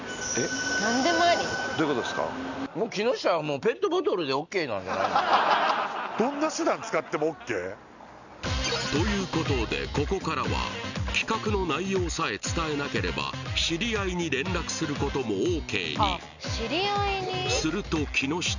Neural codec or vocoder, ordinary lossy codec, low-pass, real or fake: none; none; 7.2 kHz; real